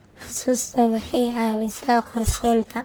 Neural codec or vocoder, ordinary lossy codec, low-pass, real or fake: codec, 44.1 kHz, 1.7 kbps, Pupu-Codec; none; none; fake